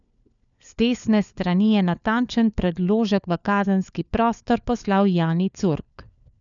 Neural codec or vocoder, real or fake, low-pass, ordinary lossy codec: codec, 16 kHz, 4 kbps, FunCodec, trained on LibriTTS, 50 frames a second; fake; 7.2 kHz; none